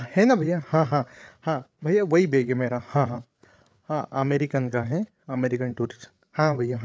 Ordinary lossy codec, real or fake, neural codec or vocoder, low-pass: none; fake; codec, 16 kHz, 8 kbps, FreqCodec, larger model; none